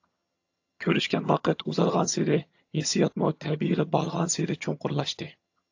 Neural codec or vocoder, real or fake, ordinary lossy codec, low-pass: vocoder, 22.05 kHz, 80 mel bands, HiFi-GAN; fake; AAC, 48 kbps; 7.2 kHz